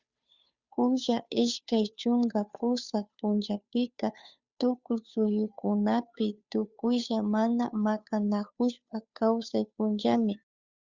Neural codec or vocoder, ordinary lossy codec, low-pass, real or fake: codec, 16 kHz, 2 kbps, FunCodec, trained on Chinese and English, 25 frames a second; Opus, 64 kbps; 7.2 kHz; fake